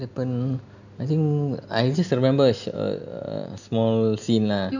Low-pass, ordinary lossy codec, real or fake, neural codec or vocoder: 7.2 kHz; none; real; none